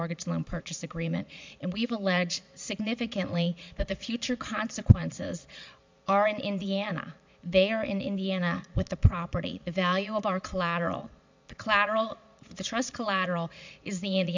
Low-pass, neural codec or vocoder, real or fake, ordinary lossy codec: 7.2 kHz; vocoder, 22.05 kHz, 80 mel bands, Vocos; fake; MP3, 64 kbps